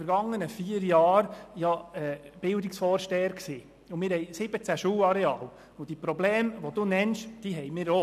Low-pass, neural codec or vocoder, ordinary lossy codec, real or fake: 14.4 kHz; none; none; real